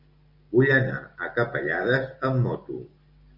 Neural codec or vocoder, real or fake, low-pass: none; real; 5.4 kHz